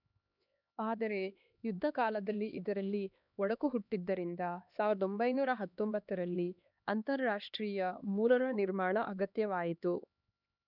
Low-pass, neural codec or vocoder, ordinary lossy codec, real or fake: 5.4 kHz; codec, 16 kHz, 2 kbps, X-Codec, HuBERT features, trained on LibriSpeech; none; fake